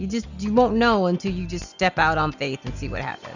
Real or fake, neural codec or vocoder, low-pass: real; none; 7.2 kHz